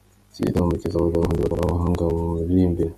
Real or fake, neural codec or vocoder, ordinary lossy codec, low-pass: real; none; MP3, 64 kbps; 14.4 kHz